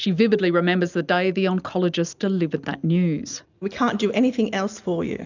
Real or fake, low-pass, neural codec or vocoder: real; 7.2 kHz; none